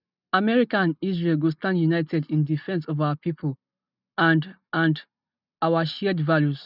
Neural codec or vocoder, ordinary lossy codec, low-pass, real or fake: none; none; 5.4 kHz; real